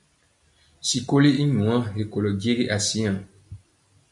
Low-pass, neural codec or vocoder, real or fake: 10.8 kHz; none; real